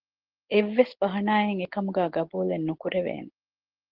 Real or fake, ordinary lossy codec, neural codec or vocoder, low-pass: real; Opus, 16 kbps; none; 5.4 kHz